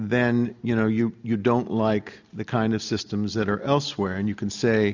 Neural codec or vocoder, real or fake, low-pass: none; real; 7.2 kHz